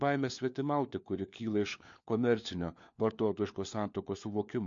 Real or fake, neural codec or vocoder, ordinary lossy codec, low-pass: fake; codec, 16 kHz, 4 kbps, FunCodec, trained on LibriTTS, 50 frames a second; MP3, 48 kbps; 7.2 kHz